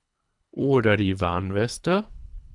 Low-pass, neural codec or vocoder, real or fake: 10.8 kHz; codec, 24 kHz, 3 kbps, HILCodec; fake